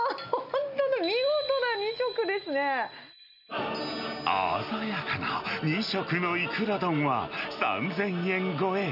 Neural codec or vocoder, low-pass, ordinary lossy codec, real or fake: none; 5.4 kHz; none; real